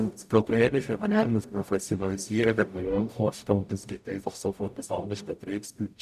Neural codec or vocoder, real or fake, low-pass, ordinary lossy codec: codec, 44.1 kHz, 0.9 kbps, DAC; fake; 14.4 kHz; none